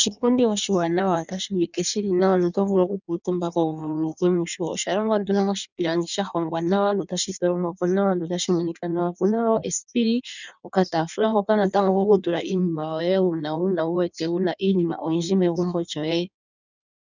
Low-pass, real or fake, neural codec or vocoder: 7.2 kHz; fake; codec, 16 kHz in and 24 kHz out, 1.1 kbps, FireRedTTS-2 codec